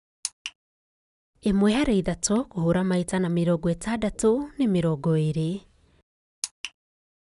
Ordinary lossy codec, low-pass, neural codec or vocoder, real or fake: none; 10.8 kHz; none; real